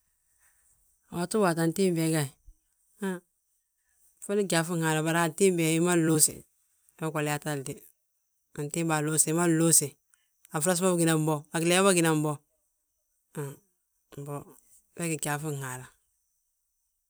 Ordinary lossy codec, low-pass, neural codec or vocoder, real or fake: none; none; vocoder, 44.1 kHz, 128 mel bands every 256 samples, BigVGAN v2; fake